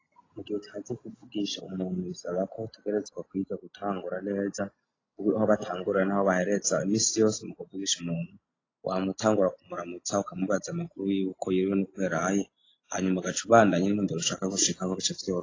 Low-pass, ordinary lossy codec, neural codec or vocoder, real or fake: 7.2 kHz; AAC, 32 kbps; none; real